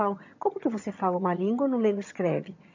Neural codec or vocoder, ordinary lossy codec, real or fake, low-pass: vocoder, 22.05 kHz, 80 mel bands, HiFi-GAN; MP3, 64 kbps; fake; 7.2 kHz